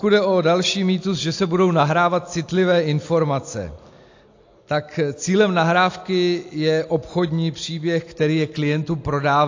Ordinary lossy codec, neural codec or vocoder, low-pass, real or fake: AAC, 48 kbps; none; 7.2 kHz; real